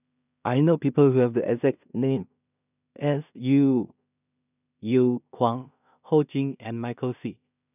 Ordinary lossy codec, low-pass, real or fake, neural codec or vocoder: none; 3.6 kHz; fake; codec, 16 kHz in and 24 kHz out, 0.4 kbps, LongCat-Audio-Codec, two codebook decoder